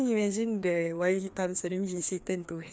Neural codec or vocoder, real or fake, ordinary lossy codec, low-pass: codec, 16 kHz, 2 kbps, FreqCodec, larger model; fake; none; none